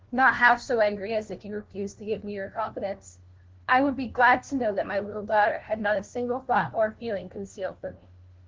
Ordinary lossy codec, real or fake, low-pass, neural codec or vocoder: Opus, 16 kbps; fake; 7.2 kHz; codec, 16 kHz, 1 kbps, FunCodec, trained on LibriTTS, 50 frames a second